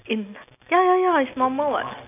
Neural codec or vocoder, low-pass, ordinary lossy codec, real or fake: none; 3.6 kHz; none; real